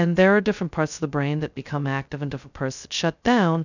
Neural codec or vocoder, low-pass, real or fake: codec, 16 kHz, 0.2 kbps, FocalCodec; 7.2 kHz; fake